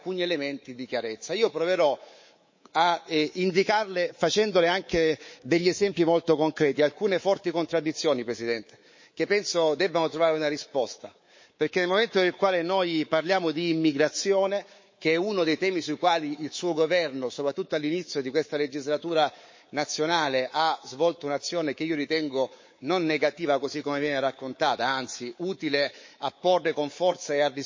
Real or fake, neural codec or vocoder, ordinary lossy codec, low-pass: fake; codec, 24 kHz, 3.1 kbps, DualCodec; MP3, 32 kbps; 7.2 kHz